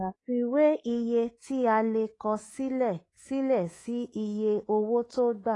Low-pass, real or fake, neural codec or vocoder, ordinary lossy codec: 10.8 kHz; fake; codec, 24 kHz, 3.1 kbps, DualCodec; AAC, 32 kbps